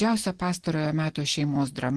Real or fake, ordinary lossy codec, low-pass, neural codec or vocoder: real; Opus, 16 kbps; 10.8 kHz; none